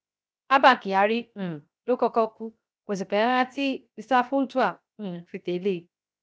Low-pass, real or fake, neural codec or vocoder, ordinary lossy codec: none; fake; codec, 16 kHz, 0.7 kbps, FocalCodec; none